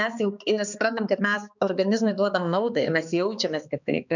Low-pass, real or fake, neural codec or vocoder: 7.2 kHz; fake; codec, 16 kHz, 2 kbps, X-Codec, HuBERT features, trained on balanced general audio